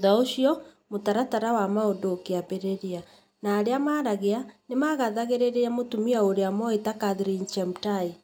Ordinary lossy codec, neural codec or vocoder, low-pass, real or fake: none; none; 19.8 kHz; real